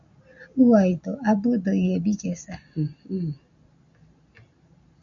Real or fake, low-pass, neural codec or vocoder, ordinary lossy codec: real; 7.2 kHz; none; MP3, 64 kbps